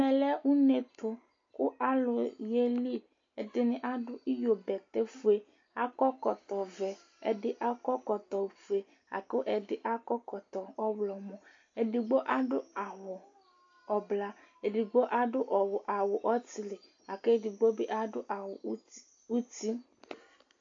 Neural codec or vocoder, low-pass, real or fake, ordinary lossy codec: none; 7.2 kHz; real; AAC, 48 kbps